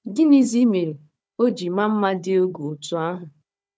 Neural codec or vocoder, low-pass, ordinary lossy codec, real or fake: codec, 16 kHz, 4 kbps, FunCodec, trained on Chinese and English, 50 frames a second; none; none; fake